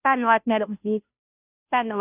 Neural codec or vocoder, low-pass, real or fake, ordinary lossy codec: codec, 16 kHz, 0.5 kbps, FunCodec, trained on Chinese and English, 25 frames a second; 3.6 kHz; fake; none